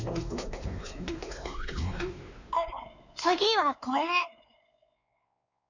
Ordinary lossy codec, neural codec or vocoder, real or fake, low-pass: none; codec, 16 kHz, 2 kbps, X-Codec, WavLM features, trained on Multilingual LibriSpeech; fake; 7.2 kHz